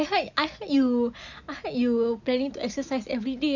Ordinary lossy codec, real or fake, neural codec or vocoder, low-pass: none; real; none; 7.2 kHz